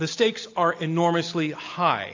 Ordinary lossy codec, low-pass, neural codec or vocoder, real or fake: AAC, 48 kbps; 7.2 kHz; none; real